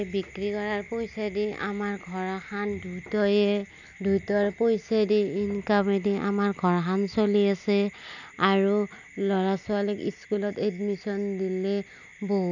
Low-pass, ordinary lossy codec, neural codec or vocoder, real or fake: 7.2 kHz; none; none; real